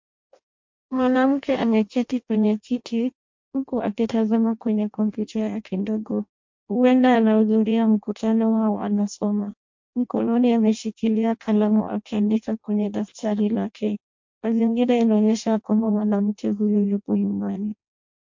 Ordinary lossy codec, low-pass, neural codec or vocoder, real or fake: MP3, 48 kbps; 7.2 kHz; codec, 16 kHz in and 24 kHz out, 0.6 kbps, FireRedTTS-2 codec; fake